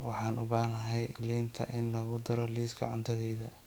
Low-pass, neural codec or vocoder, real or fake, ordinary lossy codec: none; codec, 44.1 kHz, 7.8 kbps, DAC; fake; none